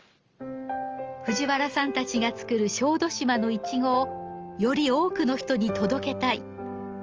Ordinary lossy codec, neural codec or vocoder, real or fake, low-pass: Opus, 24 kbps; none; real; 7.2 kHz